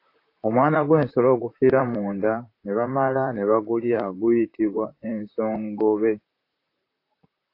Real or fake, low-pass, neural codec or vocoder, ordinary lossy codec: fake; 5.4 kHz; vocoder, 44.1 kHz, 128 mel bands, Pupu-Vocoder; AAC, 32 kbps